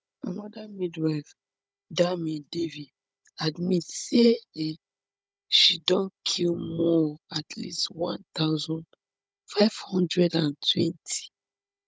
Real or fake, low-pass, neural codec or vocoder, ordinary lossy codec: fake; none; codec, 16 kHz, 16 kbps, FunCodec, trained on Chinese and English, 50 frames a second; none